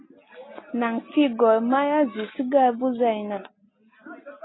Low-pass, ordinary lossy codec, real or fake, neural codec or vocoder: 7.2 kHz; AAC, 16 kbps; real; none